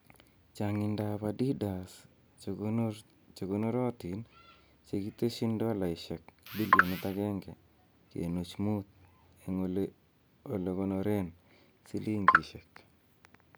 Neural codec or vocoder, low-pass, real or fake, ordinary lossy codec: none; none; real; none